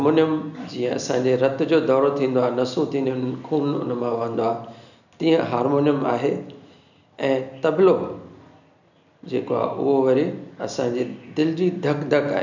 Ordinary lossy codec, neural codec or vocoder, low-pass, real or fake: none; none; 7.2 kHz; real